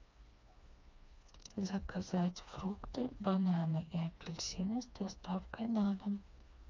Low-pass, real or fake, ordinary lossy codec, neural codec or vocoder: 7.2 kHz; fake; MP3, 64 kbps; codec, 16 kHz, 2 kbps, FreqCodec, smaller model